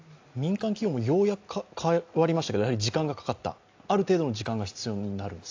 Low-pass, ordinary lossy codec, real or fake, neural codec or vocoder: 7.2 kHz; AAC, 48 kbps; real; none